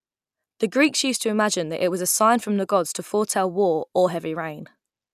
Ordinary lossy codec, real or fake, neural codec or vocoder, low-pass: none; real; none; 14.4 kHz